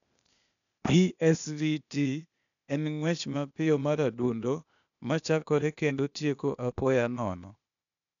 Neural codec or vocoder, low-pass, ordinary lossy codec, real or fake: codec, 16 kHz, 0.8 kbps, ZipCodec; 7.2 kHz; none; fake